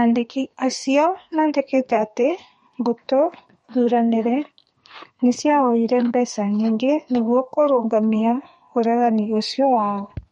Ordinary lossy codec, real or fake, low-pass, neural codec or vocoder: MP3, 48 kbps; fake; 14.4 kHz; codec, 32 kHz, 1.9 kbps, SNAC